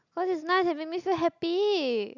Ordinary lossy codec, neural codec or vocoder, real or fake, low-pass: none; none; real; 7.2 kHz